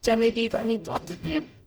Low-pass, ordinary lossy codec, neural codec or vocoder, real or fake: none; none; codec, 44.1 kHz, 0.9 kbps, DAC; fake